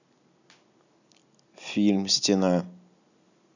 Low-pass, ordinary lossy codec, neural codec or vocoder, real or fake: 7.2 kHz; none; none; real